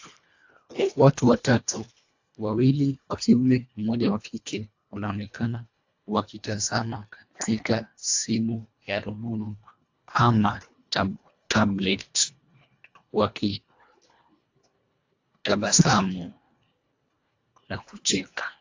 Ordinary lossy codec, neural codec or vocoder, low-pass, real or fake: AAC, 48 kbps; codec, 24 kHz, 1.5 kbps, HILCodec; 7.2 kHz; fake